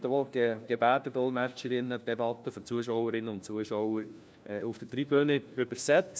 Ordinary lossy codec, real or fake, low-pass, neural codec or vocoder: none; fake; none; codec, 16 kHz, 1 kbps, FunCodec, trained on LibriTTS, 50 frames a second